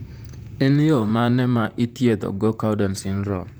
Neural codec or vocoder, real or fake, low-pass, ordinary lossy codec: vocoder, 44.1 kHz, 128 mel bands, Pupu-Vocoder; fake; none; none